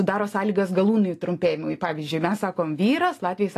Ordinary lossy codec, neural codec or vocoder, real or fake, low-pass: AAC, 48 kbps; none; real; 14.4 kHz